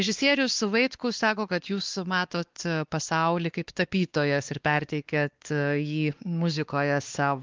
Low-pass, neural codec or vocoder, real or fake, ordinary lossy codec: 7.2 kHz; codec, 16 kHz, 2 kbps, X-Codec, WavLM features, trained on Multilingual LibriSpeech; fake; Opus, 32 kbps